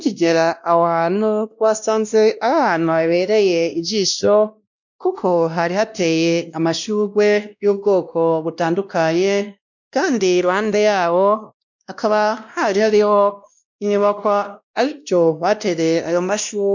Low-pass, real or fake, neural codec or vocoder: 7.2 kHz; fake; codec, 16 kHz, 1 kbps, X-Codec, WavLM features, trained on Multilingual LibriSpeech